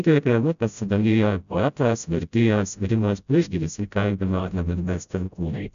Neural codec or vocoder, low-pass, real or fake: codec, 16 kHz, 0.5 kbps, FreqCodec, smaller model; 7.2 kHz; fake